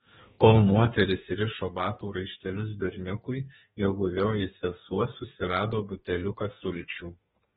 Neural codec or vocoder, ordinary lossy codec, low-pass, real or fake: codec, 32 kHz, 1.9 kbps, SNAC; AAC, 16 kbps; 14.4 kHz; fake